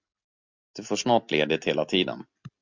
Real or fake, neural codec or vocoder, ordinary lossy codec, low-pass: real; none; MP3, 64 kbps; 7.2 kHz